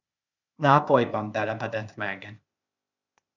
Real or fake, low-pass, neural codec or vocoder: fake; 7.2 kHz; codec, 16 kHz, 0.8 kbps, ZipCodec